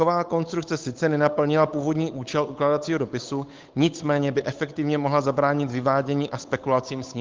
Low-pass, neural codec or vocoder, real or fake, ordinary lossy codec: 7.2 kHz; codec, 16 kHz, 8 kbps, FunCodec, trained on Chinese and English, 25 frames a second; fake; Opus, 32 kbps